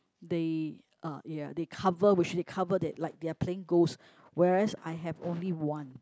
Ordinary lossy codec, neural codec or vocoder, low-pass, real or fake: none; none; none; real